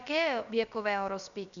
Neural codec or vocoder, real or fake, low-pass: codec, 16 kHz, about 1 kbps, DyCAST, with the encoder's durations; fake; 7.2 kHz